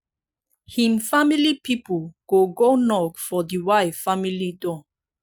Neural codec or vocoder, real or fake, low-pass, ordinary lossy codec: none; real; none; none